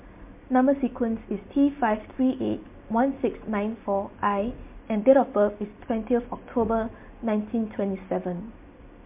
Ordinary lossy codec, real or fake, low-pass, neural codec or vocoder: MP3, 32 kbps; real; 3.6 kHz; none